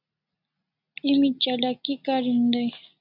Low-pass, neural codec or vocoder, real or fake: 5.4 kHz; none; real